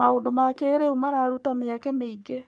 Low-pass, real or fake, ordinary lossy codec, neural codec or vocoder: 10.8 kHz; fake; none; codec, 44.1 kHz, 3.4 kbps, Pupu-Codec